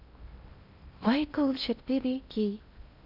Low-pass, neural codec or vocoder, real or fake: 5.4 kHz; codec, 16 kHz in and 24 kHz out, 0.6 kbps, FocalCodec, streaming, 2048 codes; fake